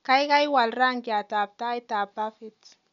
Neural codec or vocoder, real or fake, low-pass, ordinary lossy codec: none; real; 7.2 kHz; none